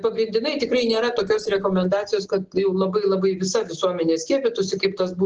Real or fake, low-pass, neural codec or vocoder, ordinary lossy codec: real; 7.2 kHz; none; Opus, 16 kbps